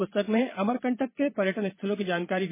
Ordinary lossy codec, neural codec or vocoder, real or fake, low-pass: MP3, 16 kbps; vocoder, 22.05 kHz, 80 mel bands, Vocos; fake; 3.6 kHz